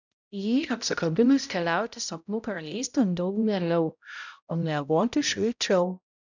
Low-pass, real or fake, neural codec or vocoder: 7.2 kHz; fake; codec, 16 kHz, 0.5 kbps, X-Codec, HuBERT features, trained on balanced general audio